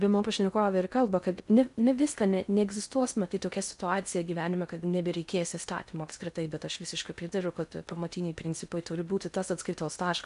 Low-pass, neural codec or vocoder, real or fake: 10.8 kHz; codec, 16 kHz in and 24 kHz out, 0.6 kbps, FocalCodec, streaming, 2048 codes; fake